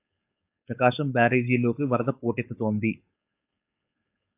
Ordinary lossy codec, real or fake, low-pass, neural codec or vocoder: AAC, 24 kbps; fake; 3.6 kHz; codec, 16 kHz, 4.8 kbps, FACodec